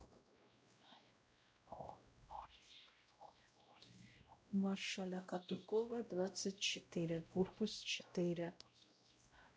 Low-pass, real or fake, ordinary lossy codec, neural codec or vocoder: none; fake; none; codec, 16 kHz, 0.5 kbps, X-Codec, WavLM features, trained on Multilingual LibriSpeech